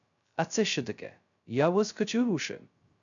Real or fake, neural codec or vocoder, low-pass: fake; codec, 16 kHz, 0.2 kbps, FocalCodec; 7.2 kHz